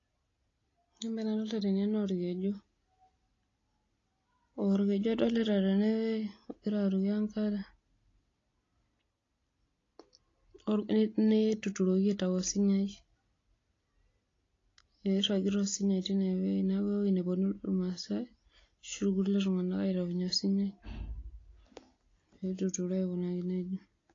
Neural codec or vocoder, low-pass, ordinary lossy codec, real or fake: none; 7.2 kHz; AAC, 32 kbps; real